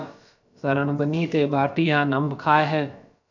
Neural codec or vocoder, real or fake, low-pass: codec, 16 kHz, about 1 kbps, DyCAST, with the encoder's durations; fake; 7.2 kHz